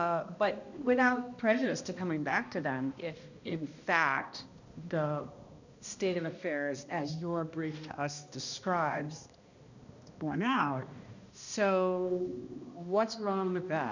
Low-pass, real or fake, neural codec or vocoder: 7.2 kHz; fake; codec, 16 kHz, 1 kbps, X-Codec, HuBERT features, trained on balanced general audio